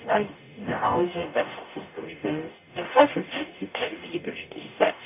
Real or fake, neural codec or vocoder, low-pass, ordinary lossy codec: fake; codec, 44.1 kHz, 0.9 kbps, DAC; 3.6 kHz; none